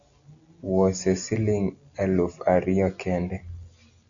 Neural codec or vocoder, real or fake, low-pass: none; real; 7.2 kHz